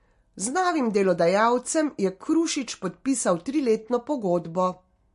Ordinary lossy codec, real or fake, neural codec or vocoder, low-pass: MP3, 48 kbps; real; none; 10.8 kHz